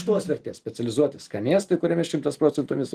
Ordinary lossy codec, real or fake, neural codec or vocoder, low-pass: Opus, 24 kbps; fake; vocoder, 44.1 kHz, 128 mel bands every 256 samples, BigVGAN v2; 14.4 kHz